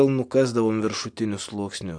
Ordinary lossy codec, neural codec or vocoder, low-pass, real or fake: AAC, 64 kbps; none; 9.9 kHz; real